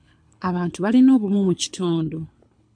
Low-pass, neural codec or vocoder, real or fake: 9.9 kHz; codec, 24 kHz, 6 kbps, HILCodec; fake